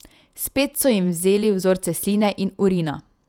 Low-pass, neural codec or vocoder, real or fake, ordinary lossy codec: 19.8 kHz; vocoder, 48 kHz, 128 mel bands, Vocos; fake; none